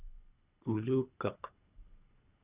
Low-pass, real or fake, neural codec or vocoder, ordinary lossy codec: 3.6 kHz; fake; codec, 24 kHz, 3 kbps, HILCodec; Opus, 64 kbps